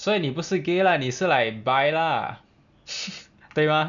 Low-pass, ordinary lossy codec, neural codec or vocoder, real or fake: 7.2 kHz; none; none; real